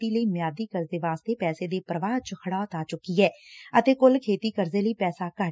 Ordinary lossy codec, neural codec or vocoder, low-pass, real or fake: none; none; none; real